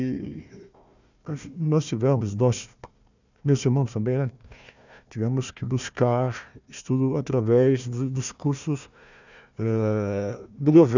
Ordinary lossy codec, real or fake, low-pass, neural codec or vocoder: none; fake; 7.2 kHz; codec, 16 kHz, 1 kbps, FunCodec, trained on Chinese and English, 50 frames a second